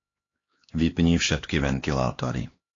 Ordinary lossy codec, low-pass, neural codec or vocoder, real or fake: AAC, 32 kbps; 7.2 kHz; codec, 16 kHz, 2 kbps, X-Codec, HuBERT features, trained on LibriSpeech; fake